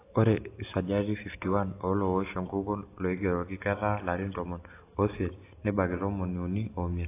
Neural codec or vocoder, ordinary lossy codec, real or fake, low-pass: none; AAC, 24 kbps; real; 3.6 kHz